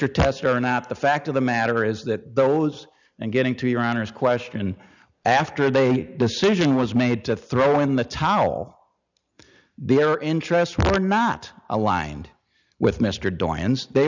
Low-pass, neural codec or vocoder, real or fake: 7.2 kHz; none; real